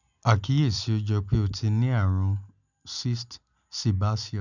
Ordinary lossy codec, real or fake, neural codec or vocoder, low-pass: none; real; none; 7.2 kHz